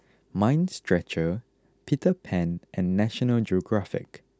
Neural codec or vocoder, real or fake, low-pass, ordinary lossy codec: none; real; none; none